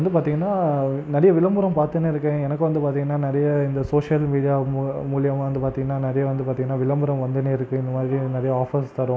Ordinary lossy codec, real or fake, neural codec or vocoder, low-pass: none; real; none; none